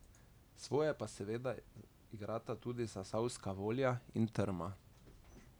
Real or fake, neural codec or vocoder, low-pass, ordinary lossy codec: real; none; none; none